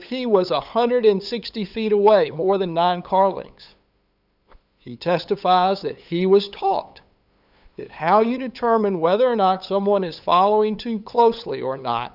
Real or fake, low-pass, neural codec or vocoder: fake; 5.4 kHz; codec, 16 kHz, 8 kbps, FunCodec, trained on LibriTTS, 25 frames a second